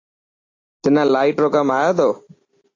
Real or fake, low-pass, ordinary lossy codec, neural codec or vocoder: real; 7.2 kHz; AAC, 32 kbps; none